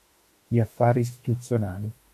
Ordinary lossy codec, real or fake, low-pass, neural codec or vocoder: MP3, 64 kbps; fake; 14.4 kHz; autoencoder, 48 kHz, 32 numbers a frame, DAC-VAE, trained on Japanese speech